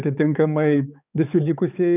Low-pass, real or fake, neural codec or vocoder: 3.6 kHz; fake; codec, 16 kHz, 4 kbps, X-Codec, HuBERT features, trained on balanced general audio